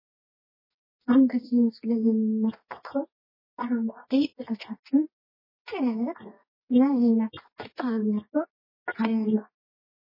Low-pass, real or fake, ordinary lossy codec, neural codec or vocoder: 5.4 kHz; fake; MP3, 24 kbps; codec, 24 kHz, 0.9 kbps, WavTokenizer, medium music audio release